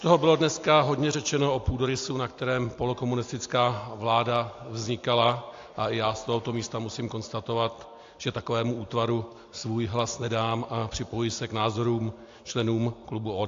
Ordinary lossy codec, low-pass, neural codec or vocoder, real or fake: AAC, 48 kbps; 7.2 kHz; none; real